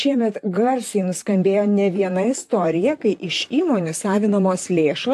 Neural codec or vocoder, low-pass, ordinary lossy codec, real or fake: vocoder, 44.1 kHz, 128 mel bands, Pupu-Vocoder; 14.4 kHz; AAC, 64 kbps; fake